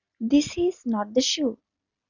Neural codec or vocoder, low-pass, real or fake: none; 7.2 kHz; real